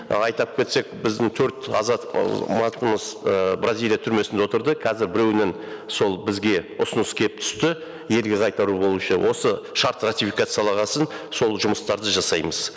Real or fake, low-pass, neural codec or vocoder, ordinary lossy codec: real; none; none; none